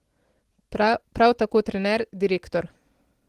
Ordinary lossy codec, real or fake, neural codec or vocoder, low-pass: Opus, 16 kbps; real; none; 14.4 kHz